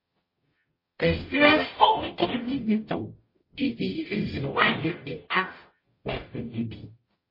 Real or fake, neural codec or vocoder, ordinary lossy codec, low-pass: fake; codec, 44.1 kHz, 0.9 kbps, DAC; MP3, 24 kbps; 5.4 kHz